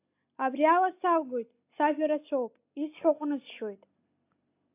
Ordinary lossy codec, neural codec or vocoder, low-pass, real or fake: AAC, 24 kbps; none; 3.6 kHz; real